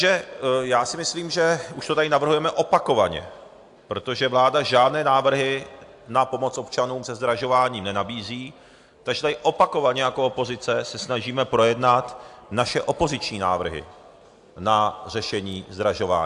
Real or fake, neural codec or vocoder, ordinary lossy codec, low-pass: real; none; AAC, 64 kbps; 10.8 kHz